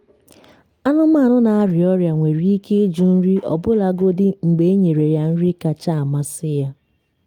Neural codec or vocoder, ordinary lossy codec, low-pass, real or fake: none; Opus, 32 kbps; 19.8 kHz; real